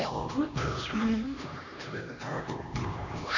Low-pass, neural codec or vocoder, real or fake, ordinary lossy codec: 7.2 kHz; codec, 16 kHz, 1 kbps, X-Codec, HuBERT features, trained on LibriSpeech; fake; none